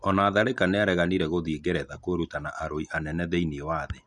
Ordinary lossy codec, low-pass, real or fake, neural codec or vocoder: none; 10.8 kHz; real; none